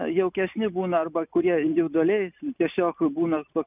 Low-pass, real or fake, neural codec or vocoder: 3.6 kHz; real; none